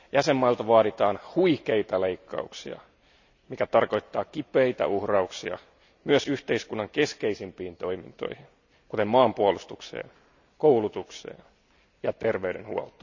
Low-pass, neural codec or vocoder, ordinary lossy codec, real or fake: 7.2 kHz; none; none; real